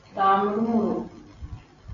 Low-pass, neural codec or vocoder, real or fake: 7.2 kHz; none; real